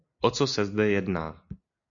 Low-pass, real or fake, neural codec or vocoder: 7.2 kHz; real; none